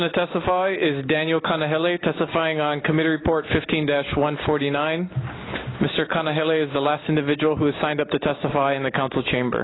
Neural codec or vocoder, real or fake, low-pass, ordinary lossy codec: none; real; 7.2 kHz; AAC, 16 kbps